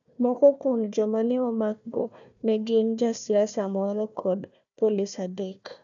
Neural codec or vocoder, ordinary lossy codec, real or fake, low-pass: codec, 16 kHz, 1 kbps, FunCodec, trained on Chinese and English, 50 frames a second; none; fake; 7.2 kHz